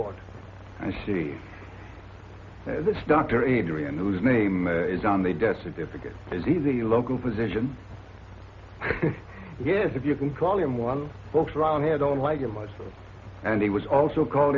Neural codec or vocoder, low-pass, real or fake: none; 7.2 kHz; real